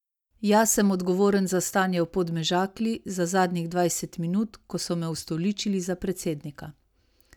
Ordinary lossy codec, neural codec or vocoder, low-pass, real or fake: none; none; 19.8 kHz; real